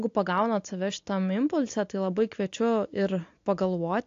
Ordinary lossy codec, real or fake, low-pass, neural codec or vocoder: AAC, 48 kbps; real; 7.2 kHz; none